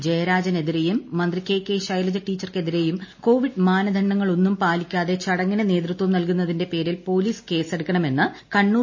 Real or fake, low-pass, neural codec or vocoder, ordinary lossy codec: real; 7.2 kHz; none; MP3, 32 kbps